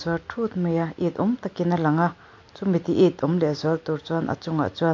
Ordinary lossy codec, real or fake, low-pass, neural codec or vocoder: MP3, 48 kbps; real; 7.2 kHz; none